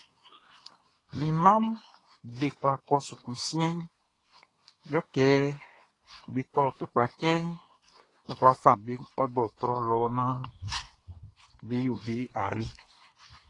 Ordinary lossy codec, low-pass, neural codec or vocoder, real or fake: AAC, 32 kbps; 10.8 kHz; codec, 24 kHz, 1 kbps, SNAC; fake